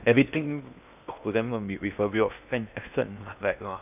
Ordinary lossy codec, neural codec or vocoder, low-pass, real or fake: none; codec, 16 kHz in and 24 kHz out, 0.8 kbps, FocalCodec, streaming, 65536 codes; 3.6 kHz; fake